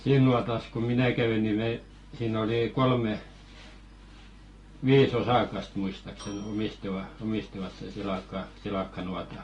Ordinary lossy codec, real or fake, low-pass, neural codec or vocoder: AAC, 32 kbps; real; 10.8 kHz; none